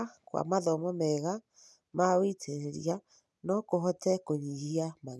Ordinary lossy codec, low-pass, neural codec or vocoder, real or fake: none; none; none; real